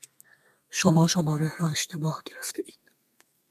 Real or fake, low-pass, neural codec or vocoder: fake; 14.4 kHz; codec, 32 kHz, 1.9 kbps, SNAC